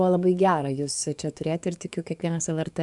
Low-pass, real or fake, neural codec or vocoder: 10.8 kHz; fake; codec, 44.1 kHz, 7.8 kbps, DAC